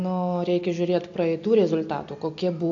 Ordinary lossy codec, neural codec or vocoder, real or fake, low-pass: AAC, 48 kbps; none; real; 7.2 kHz